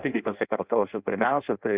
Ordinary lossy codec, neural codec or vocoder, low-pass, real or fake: Opus, 64 kbps; codec, 16 kHz in and 24 kHz out, 0.6 kbps, FireRedTTS-2 codec; 3.6 kHz; fake